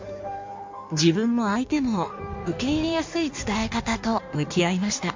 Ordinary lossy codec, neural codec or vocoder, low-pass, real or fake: MP3, 48 kbps; codec, 16 kHz in and 24 kHz out, 1.1 kbps, FireRedTTS-2 codec; 7.2 kHz; fake